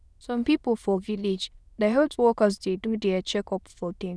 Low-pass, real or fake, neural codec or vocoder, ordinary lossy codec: none; fake; autoencoder, 22.05 kHz, a latent of 192 numbers a frame, VITS, trained on many speakers; none